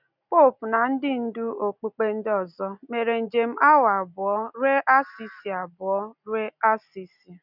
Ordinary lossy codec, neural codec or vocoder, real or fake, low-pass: none; none; real; 5.4 kHz